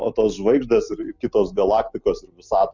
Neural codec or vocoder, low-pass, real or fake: none; 7.2 kHz; real